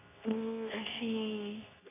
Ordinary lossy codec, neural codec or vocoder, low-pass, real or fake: AAC, 16 kbps; codec, 24 kHz, 0.9 kbps, WavTokenizer, medium speech release version 2; 3.6 kHz; fake